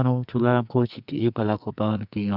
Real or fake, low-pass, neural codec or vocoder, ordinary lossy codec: fake; 5.4 kHz; codec, 44.1 kHz, 2.6 kbps, SNAC; Opus, 64 kbps